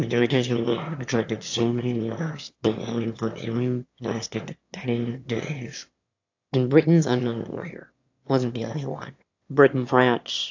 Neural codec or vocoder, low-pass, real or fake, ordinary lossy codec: autoencoder, 22.05 kHz, a latent of 192 numbers a frame, VITS, trained on one speaker; 7.2 kHz; fake; AAC, 48 kbps